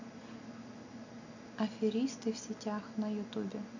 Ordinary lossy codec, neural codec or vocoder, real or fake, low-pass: none; none; real; 7.2 kHz